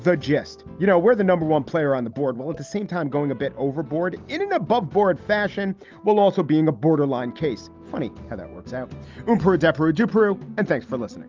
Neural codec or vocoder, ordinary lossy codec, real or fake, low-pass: none; Opus, 32 kbps; real; 7.2 kHz